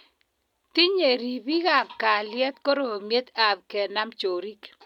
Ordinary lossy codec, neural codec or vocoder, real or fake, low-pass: none; none; real; 19.8 kHz